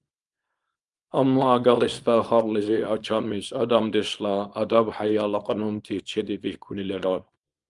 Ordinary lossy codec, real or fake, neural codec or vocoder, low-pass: Opus, 32 kbps; fake; codec, 24 kHz, 0.9 kbps, WavTokenizer, small release; 10.8 kHz